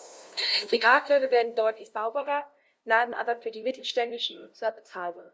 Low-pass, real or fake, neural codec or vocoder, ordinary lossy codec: none; fake; codec, 16 kHz, 0.5 kbps, FunCodec, trained on LibriTTS, 25 frames a second; none